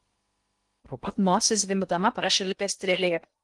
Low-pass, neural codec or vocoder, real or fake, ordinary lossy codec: 10.8 kHz; codec, 16 kHz in and 24 kHz out, 0.6 kbps, FocalCodec, streaming, 2048 codes; fake; Opus, 24 kbps